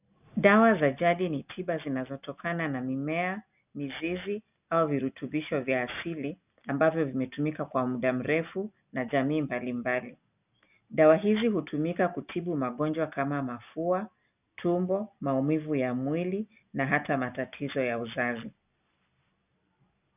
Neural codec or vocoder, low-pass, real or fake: none; 3.6 kHz; real